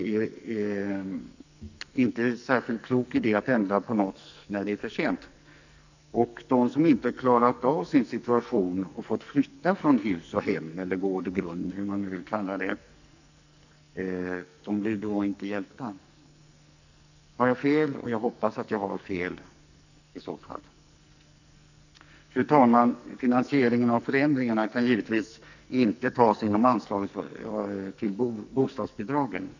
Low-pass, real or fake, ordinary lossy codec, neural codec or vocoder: 7.2 kHz; fake; none; codec, 44.1 kHz, 2.6 kbps, SNAC